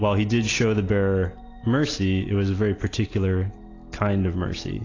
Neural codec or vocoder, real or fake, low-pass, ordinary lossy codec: none; real; 7.2 kHz; AAC, 32 kbps